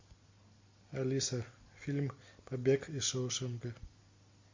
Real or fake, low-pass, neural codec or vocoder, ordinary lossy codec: real; 7.2 kHz; none; MP3, 48 kbps